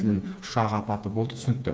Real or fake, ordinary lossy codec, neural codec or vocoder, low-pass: fake; none; codec, 16 kHz, 4 kbps, FreqCodec, smaller model; none